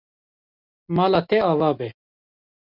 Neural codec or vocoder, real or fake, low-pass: none; real; 5.4 kHz